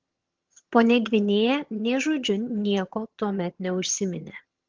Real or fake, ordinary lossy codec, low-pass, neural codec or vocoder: fake; Opus, 16 kbps; 7.2 kHz; vocoder, 22.05 kHz, 80 mel bands, HiFi-GAN